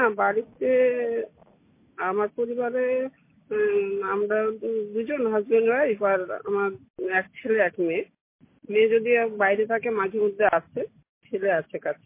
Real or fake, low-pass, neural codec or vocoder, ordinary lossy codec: real; 3.6 kHz; none; MP3, 24 kbps